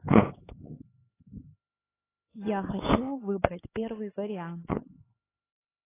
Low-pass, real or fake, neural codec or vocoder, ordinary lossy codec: 3.6 kHz; fake; codec, 16 kHz, 4 kbps, X-Codec, HuBERT features, trained on LibriSpeech; AAC, 16 kbps